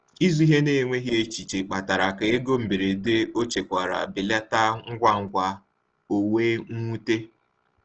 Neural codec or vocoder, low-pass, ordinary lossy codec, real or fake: none; 7.2 kHz; Opus, 16 kbps; real